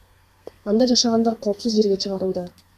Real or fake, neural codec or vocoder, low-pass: fake; codec, 32 kHz, 1.9 kbps, SNAC; 14.4 kHz